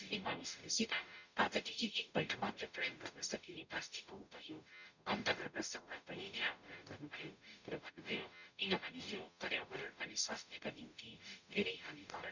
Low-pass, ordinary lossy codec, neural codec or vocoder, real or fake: 7.2 kHz; none; codec, 44.1 kHz, 0.9 kbps, DAC; fake